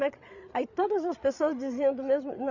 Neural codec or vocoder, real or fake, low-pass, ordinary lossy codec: codec, 16 kHz, 8 kbps, FreqCodec, larger model; fake; 7.2 kHz; none